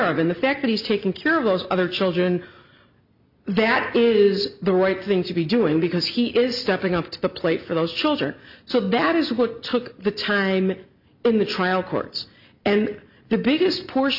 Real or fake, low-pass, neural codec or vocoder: real; 5.4 kHz; none